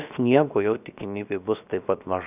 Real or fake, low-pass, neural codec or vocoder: fake; 3.6 kHz; codec, 16 kHz, 0.7 kbps, FocalCodec